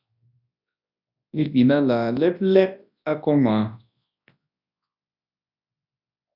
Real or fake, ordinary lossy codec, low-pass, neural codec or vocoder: fake; AAC, 48 kbps; 5.4 kHz; codec, 24 kHz, 0.9 kbps, WavTokenizer, large speech release